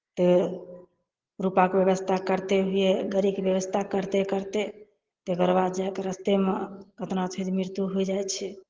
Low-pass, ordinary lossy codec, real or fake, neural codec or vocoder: 7.2 kHz; Opus, 16 kbps; real; none